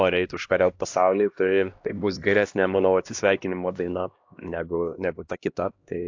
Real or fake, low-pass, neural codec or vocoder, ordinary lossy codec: fake; 7.2 kHz; codec, 16 kHz, 1 kbps, X-Codec, HuBERT features, trained on LibriSpeech; AAC, 48 kbps